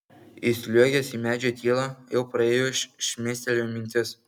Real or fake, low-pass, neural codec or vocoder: real; 19.8 kHz; none